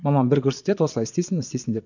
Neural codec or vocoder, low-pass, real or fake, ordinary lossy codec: vocoder, 22.05 kHz, 80 mel bands, Vocos; 7.2 kHz; fake; none